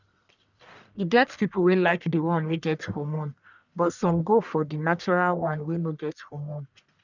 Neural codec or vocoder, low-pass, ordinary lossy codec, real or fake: codec, 44.1 kHz, 1.7 kbps, Pupu-Codec; 7.2 kHz; none; fake